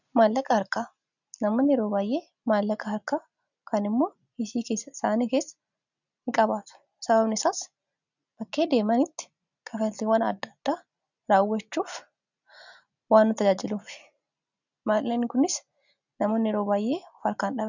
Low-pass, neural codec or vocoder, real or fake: 7.2 kHz; none; real